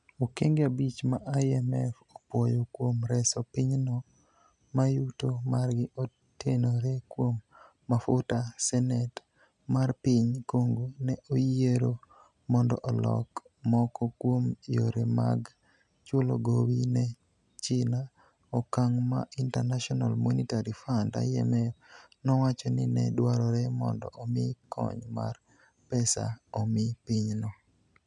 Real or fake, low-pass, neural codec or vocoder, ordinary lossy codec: real; 10.8 kHz; none; none